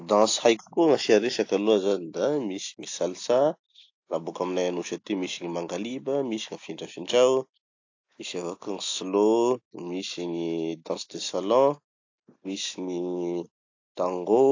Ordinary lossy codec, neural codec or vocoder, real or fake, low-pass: AAC, 48 kbps; none; real; 7.2 kHz